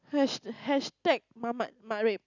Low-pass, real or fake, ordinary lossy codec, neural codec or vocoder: 7.2 kHz; real; none; none